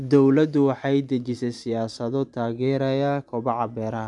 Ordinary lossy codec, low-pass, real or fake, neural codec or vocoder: none; 10.8 kHz; fake; vocoder, 44.1 kHz, 128 mel bands every 512 samples, BigVGAN v2